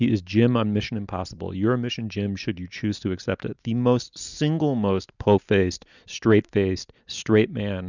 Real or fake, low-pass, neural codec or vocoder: real; 7.2 kHz; none